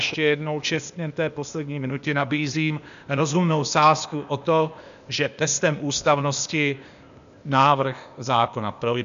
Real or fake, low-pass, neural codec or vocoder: fake; 7.2 kHz; codec, 16 kHz, 0.8 kbps, ZipCodec